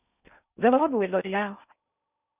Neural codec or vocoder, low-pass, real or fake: codec, 16 kHz in and 24 kHz out, 0.6 kbps, FocalCodec, streaming, 4096 codes; 3.6 kHz; fake